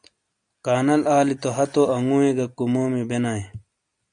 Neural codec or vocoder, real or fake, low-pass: none; real; 9.9 kHz